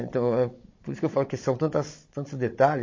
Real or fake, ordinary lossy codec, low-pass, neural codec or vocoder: fake; MP3, 32 kbps; 7.2 kHz; codec, 16 kHz, 16 kbps, FunCodec, trained on Chinese and English, 50 frames a second